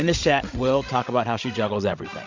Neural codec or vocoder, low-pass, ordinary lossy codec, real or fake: codec, 16 kHz, 16 kbps, FreqCodec, larger model; 7.2 kHz; MP3, 48 kbps; fake